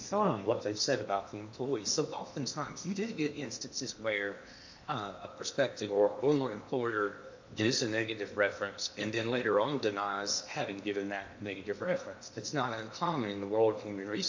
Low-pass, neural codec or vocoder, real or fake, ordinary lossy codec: 7.2 kHz; codec, 16 kHz in and 24 kHz out, 0.8 kbps, FocalCodec, streaming, 65536 codes; fake; MP3, 48 kbps